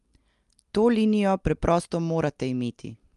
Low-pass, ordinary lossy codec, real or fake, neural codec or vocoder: 10.8 kHz; Opus, 32 kbps; real; none